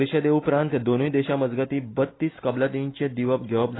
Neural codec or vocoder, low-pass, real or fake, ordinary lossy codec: none; 7.2 kHz; real; AAC, 16 kbps